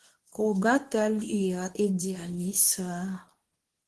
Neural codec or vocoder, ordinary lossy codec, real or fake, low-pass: codec, 24 kHz, 0.9 kbps, WavTokenizer, medium speech release version 2; Opus, 16 kbps; fake; 10.8 kHz